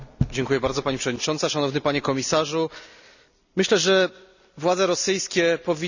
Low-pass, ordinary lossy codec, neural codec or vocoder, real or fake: 7.2 kHz; none; none; real